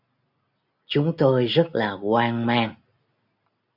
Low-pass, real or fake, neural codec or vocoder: 5.4 kHz; real; none